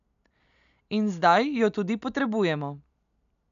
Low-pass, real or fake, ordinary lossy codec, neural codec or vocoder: 7.2 kHz; real; none; none